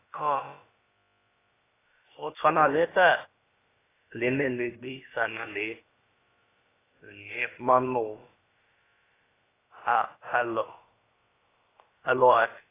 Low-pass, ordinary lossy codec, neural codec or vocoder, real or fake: 3.6 kHz; AAC, 16 kbps; codec, 16 kHz, about 1 kbps, DyCAST, with the encoder's durations; fake